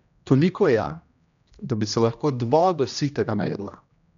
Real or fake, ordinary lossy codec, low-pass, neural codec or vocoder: fake; none; 7.2 kHz; codec, 16 kHz, 1 kbps, X-Codec, HuBERT features, trained on general audio